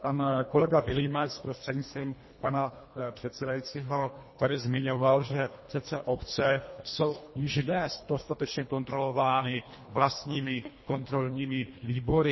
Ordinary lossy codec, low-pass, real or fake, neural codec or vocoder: MP3, 24 kbps; 7.2 kHz; fake; codec, 24 kHz, 1.5 kbps, HILCodec